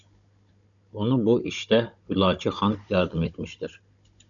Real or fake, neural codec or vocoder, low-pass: fake; codec, 16 kHz, 16 kbps, FunCodec, trained on Chinese and English, 50 frames a second; 7.2 kHz